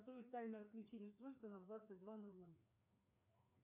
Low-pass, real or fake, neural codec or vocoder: 3.6 kHz; fake; codec, 16 kHz, 2 kbps, FreqCodec, larger model